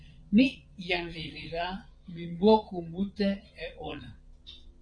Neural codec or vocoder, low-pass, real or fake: vocoder, 22.05 kHz, 80 mel bands, Vocos; 9.9 kHz; fake